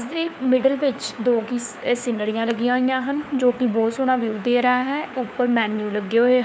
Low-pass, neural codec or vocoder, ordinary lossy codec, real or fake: none; codec, 16 kHz, 4 kbps, FunCodec, trained on LibriTTS, 50 frames a second; none; fake